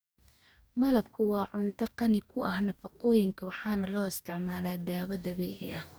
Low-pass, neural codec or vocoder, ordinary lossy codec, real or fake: none; codec, 44.1 kHz, 2.6 kbps, DAC; none; fake